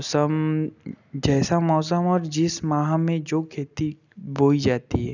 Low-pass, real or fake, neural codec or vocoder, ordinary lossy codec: 7.2 kHz; real; none; none